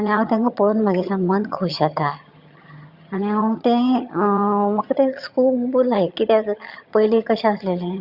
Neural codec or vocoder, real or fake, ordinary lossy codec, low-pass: vocoder, 22.05 kHz, 80 mel bands, HiFi-GAN; fake; Opus, 64 kbps; 5.4 kHz